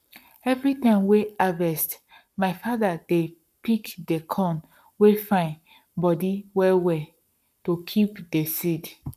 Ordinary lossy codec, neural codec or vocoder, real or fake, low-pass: none; codec, 44.1 kHz, 7.8 kbps, Pupu-Codec; fake; 14.4 kHz